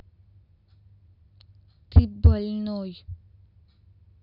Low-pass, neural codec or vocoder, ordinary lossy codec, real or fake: 5.4 kHz; none; none; real